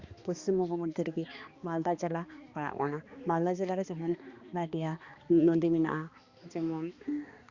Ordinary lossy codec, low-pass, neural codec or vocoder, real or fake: Opus, 64 kbps; 7.2 kHz; codec, 16 kHz, 2 kbps, X-Codec, HuBERT features, trained on balanced general audio; fake